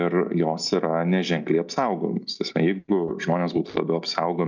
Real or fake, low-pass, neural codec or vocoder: real; 7.2 kHz; none